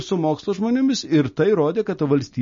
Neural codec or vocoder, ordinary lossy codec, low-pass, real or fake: none; MP3, 32 kbps; 7.2 kHz; real